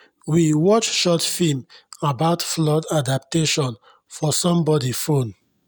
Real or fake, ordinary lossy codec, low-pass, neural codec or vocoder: real; none; none; none